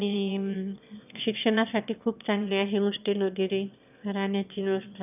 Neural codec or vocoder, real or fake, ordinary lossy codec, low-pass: autoencoder, 22.05 kHz, a latent of 192 numbers a frame, VITS, trained on one speaker; fake; none; 3.6 kHz